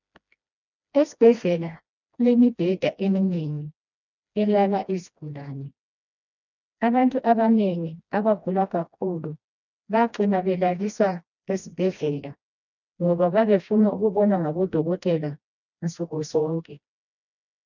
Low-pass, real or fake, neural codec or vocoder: 7.2 kHz; fake; codec, 16 kHz, 1 kbps, FreqCodec, smaller model